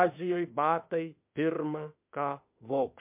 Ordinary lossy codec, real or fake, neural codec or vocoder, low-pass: MP3, 32 kbps; fake; autoencoder, 48 kHz, 32 numbers a frame, DAC-VAE, trained on Japanese speech; 3.6 kHz